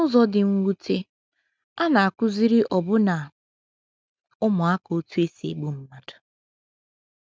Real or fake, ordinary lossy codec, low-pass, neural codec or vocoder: real; none; none; none